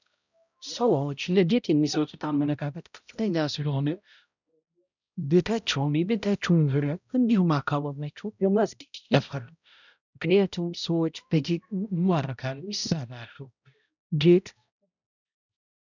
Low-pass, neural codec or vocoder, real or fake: 7.2 kHz; codec, 16 kHz, 0.5 kbps, X-Codec, HuBERT features, trained on balanced general audio; fake